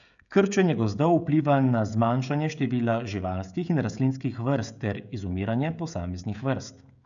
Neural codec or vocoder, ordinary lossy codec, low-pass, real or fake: codec, 16 kHz, 16 kbps, FreqCodec, smaller model; none; 7.2 kHz; fake